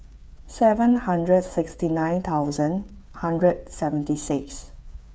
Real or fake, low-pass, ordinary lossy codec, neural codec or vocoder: fake; none; none; codec, 16 kHz, 8 kbps, FreqCodec, smaller model